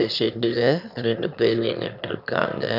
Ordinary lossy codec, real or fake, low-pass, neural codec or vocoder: none; fake; 5.4 kHz; autoencoder, 22.05 kHz, a latent of 192 numbers a frame, VITS, trained on one speaker